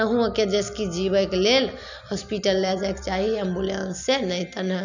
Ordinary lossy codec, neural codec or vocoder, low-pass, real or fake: none; none; 7.2 kHz; real